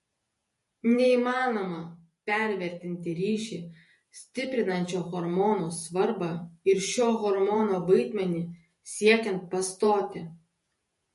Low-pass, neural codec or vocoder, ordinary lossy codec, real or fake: 14.4 kHz; vocoder, 48 kHz, 128 mel bands, Vocos; MP3, 48 kbps; fake